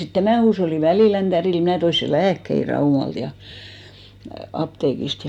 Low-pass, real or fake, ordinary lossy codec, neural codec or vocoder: 19.8 kHz; real; none; none